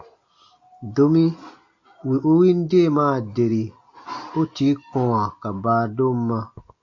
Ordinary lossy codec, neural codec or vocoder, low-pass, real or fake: AAC, 48 kbps; none; 7.2 kHz; real